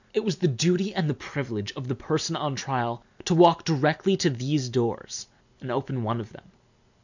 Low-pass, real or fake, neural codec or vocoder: 7.2 kHz; real; none